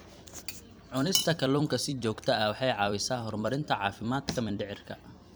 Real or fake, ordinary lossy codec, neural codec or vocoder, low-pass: fake; none; vocoder, 44.1 kHz, 128 mel bands every 256 samples, BigVGAN v2; none